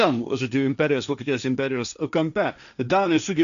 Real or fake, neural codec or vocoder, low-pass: fake; codec, 16 kHz, 1.1 kbps, Voila-Tokenizer; 7.2 kHz